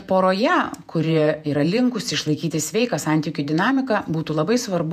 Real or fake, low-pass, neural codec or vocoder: fake; 14.4 kHz; vocoder, 48 kHz, 128 mel bands, Vocos